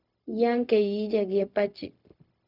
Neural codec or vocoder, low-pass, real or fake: codec, 16 kHz, 0.4 kbps, LongCat-Audio-Codec; 5.4 kHz; fake